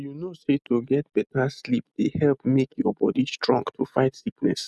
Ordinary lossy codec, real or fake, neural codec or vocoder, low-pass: none; real; none; none